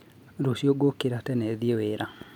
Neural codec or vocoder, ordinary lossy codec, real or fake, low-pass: none; none; real; 19.8 kHz